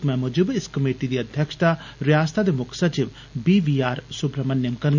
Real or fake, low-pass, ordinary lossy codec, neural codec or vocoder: real; 7.2 kHz; none; none